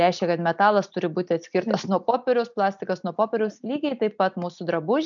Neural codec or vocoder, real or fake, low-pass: none; real; 7.2 kHz